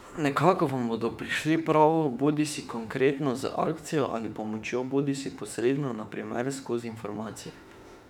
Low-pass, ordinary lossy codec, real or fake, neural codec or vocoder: 19.8 kHz; none; fake; autoencoder, 48 kHz, 32 numbers a frame, DAC-VAE, trained on Japanese speech